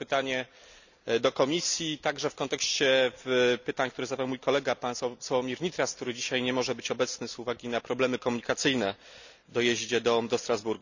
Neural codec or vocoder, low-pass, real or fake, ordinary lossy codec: none; 7.2 kHz; real; none